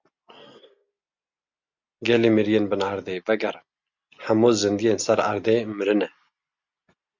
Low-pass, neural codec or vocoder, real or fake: 7.2 kHz; none; real